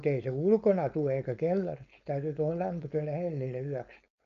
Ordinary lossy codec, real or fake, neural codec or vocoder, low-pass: AAC, 96 kbps; fake; codec, 16 kHz, 4.8 kbps, FACodec; 7.2 kHz